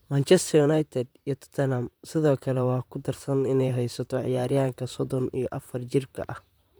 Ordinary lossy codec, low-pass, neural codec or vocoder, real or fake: none; none; vocoder, 44.1 kHz, 128 mel bands, Pupu-Vocoder; fake